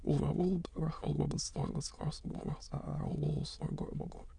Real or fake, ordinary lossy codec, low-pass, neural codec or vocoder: fake; Opus, 64 kbps; 9.9 kHz; autoencoder, 22.05 kHz, a latent of 192 numbers a frame, VITS, trained on many speakers